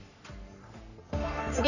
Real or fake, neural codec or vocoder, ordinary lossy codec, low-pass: fake; codec, 44.1 kHz, 3.4 kbps, Pupu-Codec; none; 7.2 kHz